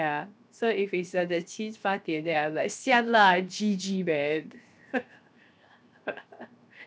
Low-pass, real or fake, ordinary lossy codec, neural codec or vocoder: none; fake; none; codec, 16 kHz, 0.7 kbps, FocalCodec